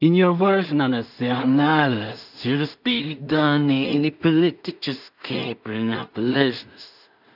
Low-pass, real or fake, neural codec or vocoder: 5.4 kHz; fake; codec, 16 kHz in and 24 kHz out, 0.4 kbps, LongCat-Audio-Codec, two codebook decoder